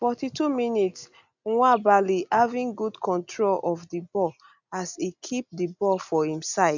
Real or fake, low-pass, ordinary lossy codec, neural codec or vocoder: real; 7.2 kHz; none; none